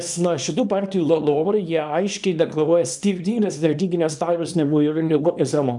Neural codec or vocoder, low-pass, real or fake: codec, 24 kHz, 0.9 kbps, WavTokenizer, small release; 10.8 kHz; fake